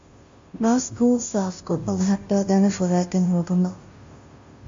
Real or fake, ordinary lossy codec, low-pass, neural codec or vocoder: fake; MP3, 48 kbps; 7.2 kHz; codec, 16 kHz, 0.5 kbps, FunCodec, trained on Chinese and English, 25 frames a second